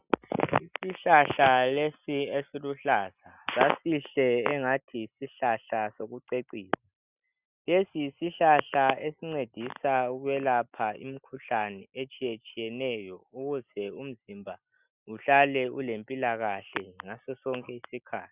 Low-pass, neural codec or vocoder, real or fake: 3.6 kHz; none; real